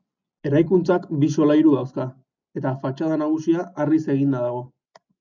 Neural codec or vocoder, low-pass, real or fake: none; 7.2 kHz; real